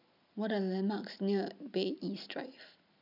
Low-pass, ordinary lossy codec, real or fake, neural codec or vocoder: 5.4 kHz; none; real; none